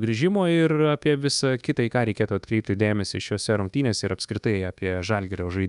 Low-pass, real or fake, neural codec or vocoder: 10.8 kHz; fake; codec, 24 kHz, 1.2 kbps, DualCodec